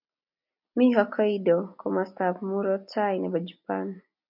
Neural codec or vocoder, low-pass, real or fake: none; 5.4 kHz; real